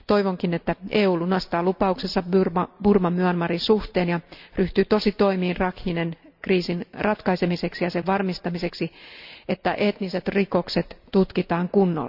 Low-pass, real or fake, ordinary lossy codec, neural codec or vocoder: 5.4 kHz; real; none; none